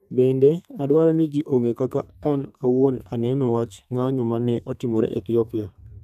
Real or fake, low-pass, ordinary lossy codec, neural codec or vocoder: fake; 14.4 kHz; none; codec, 32 kHz, 1.9 kbps, SNAC